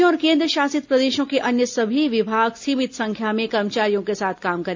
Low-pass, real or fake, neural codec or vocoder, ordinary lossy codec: 7.2 kHz; real; none; MP3, 64 kbps